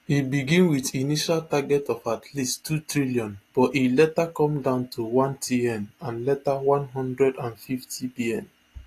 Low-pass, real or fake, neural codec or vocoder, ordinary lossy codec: 14.4 kHz; real; none; AAC, 48 kbps